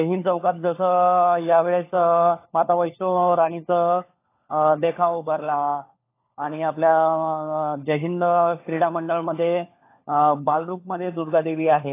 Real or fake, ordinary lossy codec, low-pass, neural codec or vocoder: fake; AAC, 24 kbps; 3.6 kHz; codec, 16 kHz, 4 kbps, FunCodec, trained on LibriTTS, 50 frames a second